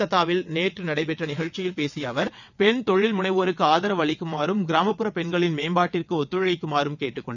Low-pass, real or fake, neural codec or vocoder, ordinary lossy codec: 7.2 kHz; fake; vocoder, 22.05 kHz, 80 mel bands, WaveNeXt; none